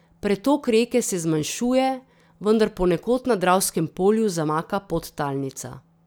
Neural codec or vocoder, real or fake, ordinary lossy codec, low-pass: none; real; none; none